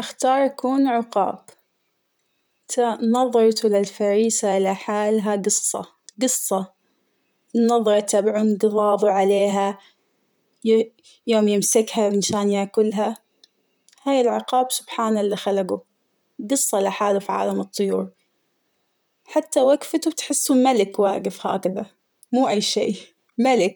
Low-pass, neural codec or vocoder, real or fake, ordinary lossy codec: none; vocoder, 44.1 kHz, 128 mel bands, Pupu-Vocoder; fake; none